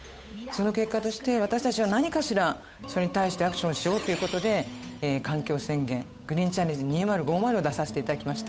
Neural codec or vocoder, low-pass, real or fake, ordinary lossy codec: codec, 16 kHz, 8 kbps, FunCodec, trained on Chinese and English, 25 frames a second; none; fake; none